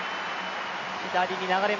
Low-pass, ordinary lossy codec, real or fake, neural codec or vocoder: 7.2 kHz; none; real; none